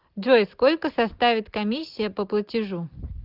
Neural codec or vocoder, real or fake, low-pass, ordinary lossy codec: none; real; 5.4 kHz; Opus, 32 kbps